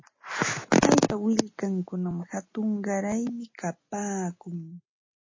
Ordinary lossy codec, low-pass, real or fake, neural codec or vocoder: MP3, 32 kbps; 7.2 kHz; real; none